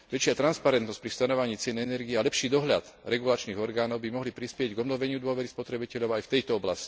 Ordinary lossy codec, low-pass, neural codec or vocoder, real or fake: none; none; none; real